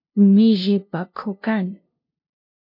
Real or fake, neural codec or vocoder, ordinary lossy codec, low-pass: fake; codec, 16 kHz, 0.5 kbps, FunCodec, trained on LibriTTS, 25 frames a second; MP3, 32 kbps; 5.4 kHz